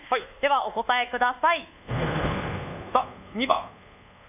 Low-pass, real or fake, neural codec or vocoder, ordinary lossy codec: 3.6 kHz; fake; codec, 24 kHz, 1.2 kbps, DualCodec; none